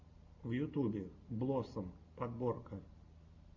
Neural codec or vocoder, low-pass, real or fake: none; 7.2 kHz; real